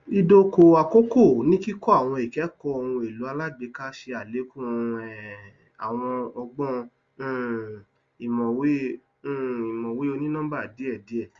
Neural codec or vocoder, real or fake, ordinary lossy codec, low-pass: none; real; Opus, 32 kbps; 7.2 kHz